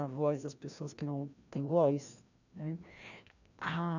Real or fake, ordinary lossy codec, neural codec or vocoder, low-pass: fake; none; codec, 16 kHz, 1 kbps, FreqCodec, larger model; 7.2 kHz